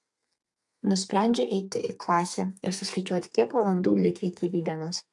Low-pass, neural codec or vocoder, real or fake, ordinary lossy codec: 10.8 kHz; codec, 32 kHz, 1.9 kbps, SNAC; fake; AAC, 64 kbps